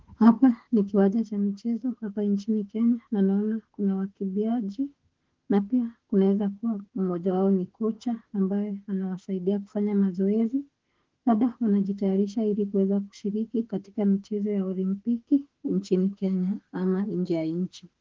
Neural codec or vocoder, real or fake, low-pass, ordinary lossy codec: autoencoder, 48 kHz, 32 numbers a frame, DAC-VAE, trained on Japanese speech; fake; 7.2 kHz; Opus, 16 kbps